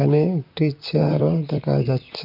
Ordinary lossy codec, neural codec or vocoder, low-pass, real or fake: none; vocoder, 44.1 kHz, 128 mel bands, Pupu-Vocoder; 5.4 kHz; fake